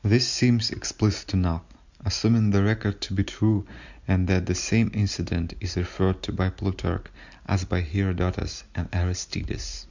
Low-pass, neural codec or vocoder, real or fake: 7.2 kHz; none; real